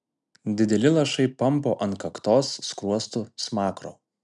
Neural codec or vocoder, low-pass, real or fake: none; 10.8 kHz; real